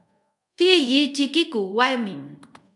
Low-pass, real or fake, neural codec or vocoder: 10.8 kHz; fake; codec, 24 kHz, 0.5 kbps, DualCodec